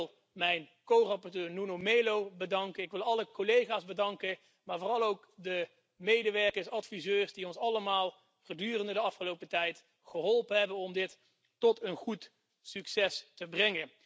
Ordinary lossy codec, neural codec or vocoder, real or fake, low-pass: none; none; real; none